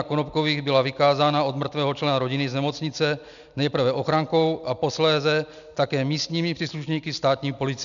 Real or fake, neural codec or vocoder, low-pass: real; none; 7.2 kHz